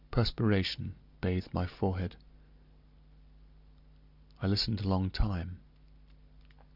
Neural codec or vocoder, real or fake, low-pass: none; real; 5.4 kHz